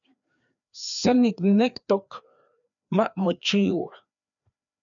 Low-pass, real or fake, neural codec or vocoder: 7.2 kHz; fake; codec, 16 kHz, 2 kbps, FreqCodec, larger model